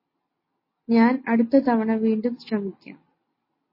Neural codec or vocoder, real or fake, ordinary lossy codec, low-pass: none; real; MP3, 24 kbps; 5.4 kHz